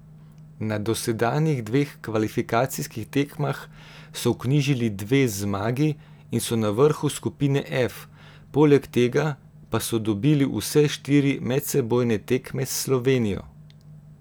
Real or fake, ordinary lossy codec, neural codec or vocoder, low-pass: real; none; none; none